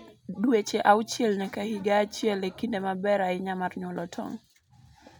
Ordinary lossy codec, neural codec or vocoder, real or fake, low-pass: none; none; real; none